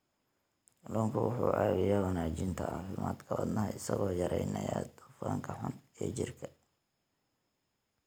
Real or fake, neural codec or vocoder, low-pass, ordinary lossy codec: real; none; none; none